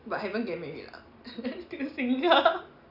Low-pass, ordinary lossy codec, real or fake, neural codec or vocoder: 5.4 kHz; none; real; none